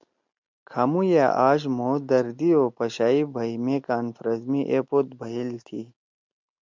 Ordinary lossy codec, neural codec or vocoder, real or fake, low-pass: MP3, 64 kbps; none; real; 7.2 kHz